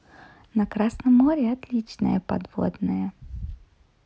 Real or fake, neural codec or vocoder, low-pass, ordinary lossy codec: real; none; none; none